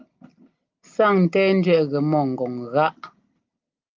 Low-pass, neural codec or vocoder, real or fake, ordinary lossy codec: 7.2 kHz; none; real; Opus, 24 kbps